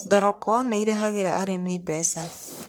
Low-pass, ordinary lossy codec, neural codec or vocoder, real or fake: none; none; codec, 44.1 kHz, 1.7 kbps, Pupu-Codec; fake